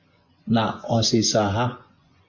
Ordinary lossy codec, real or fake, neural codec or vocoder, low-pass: MP3, 48 kbps; fake; vocoder, 24 kHz, 100 mel bands, Vocos; 7.2 kHz